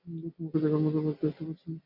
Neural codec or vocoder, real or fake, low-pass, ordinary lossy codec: none; real; 5.4 kHz; MP3, 48 kbps